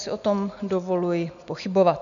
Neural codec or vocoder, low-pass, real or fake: none; 7.2 kHz; real